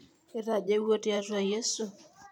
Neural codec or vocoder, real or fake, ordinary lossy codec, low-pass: vocoder, 44.1 kHz, 128 mel bands every 512 samples, BigVGAN v2; fake; MP3, 96 kbps; 19.8 kHz